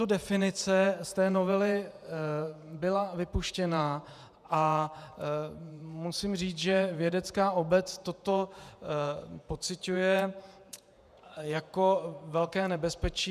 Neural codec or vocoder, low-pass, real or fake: vocoder, 48 kHz, 128 mel bands, Vocos; 14.4 kHz; fake